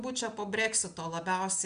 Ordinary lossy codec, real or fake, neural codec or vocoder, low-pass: MP3, 96 kbps; real; none; 9.9 kHz